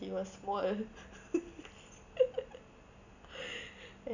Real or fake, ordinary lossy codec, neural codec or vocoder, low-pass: real; none; none; 7.2 kHz